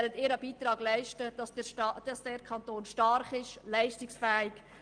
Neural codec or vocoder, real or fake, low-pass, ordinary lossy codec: none; real; 9.9 kHz; Opus, 24 kbps